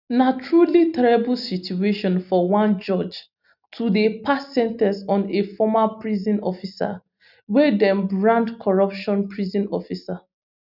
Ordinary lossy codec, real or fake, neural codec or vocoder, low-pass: none; real; none; 5.4 kHz